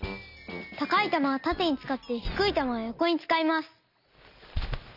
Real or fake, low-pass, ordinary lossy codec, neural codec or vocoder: real; 5.4 kHz; none; none